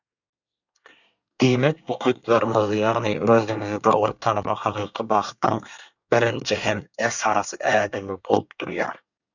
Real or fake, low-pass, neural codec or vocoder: fake; 7.2 kHz; codec, 24 kHz, 1 kbps, SNAC